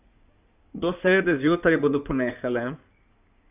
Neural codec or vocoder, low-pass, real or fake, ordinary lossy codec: codec, 16 kHz in and 24 kHz out, 2.2 kbps, FireRedTTS-2 codec; 3.6 kHz; fake; none